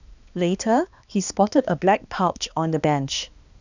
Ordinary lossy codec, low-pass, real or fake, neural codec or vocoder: none; 7.2 kHz; fake; codec, 16 kHz, 2 kbps, X-Codec, HuBERT features, trained on balanced general audio